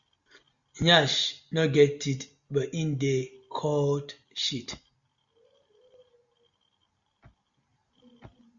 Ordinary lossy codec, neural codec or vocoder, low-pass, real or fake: Opus, 64 kbps; none; 7.2 kHz; real